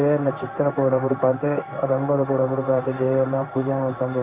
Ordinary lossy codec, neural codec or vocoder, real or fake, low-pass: Opus, 64 kbps; codec, 16 kHz in and 24 kHz out, 1 kbps, XY-Tokenizer; fake; 3.6 kHz